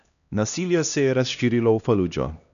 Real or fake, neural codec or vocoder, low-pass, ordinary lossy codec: fake; codec, 16 kHz, 1 kbps, X-Codec, HuBERT features, trained on LibriSpeech; 7.2 kHz; none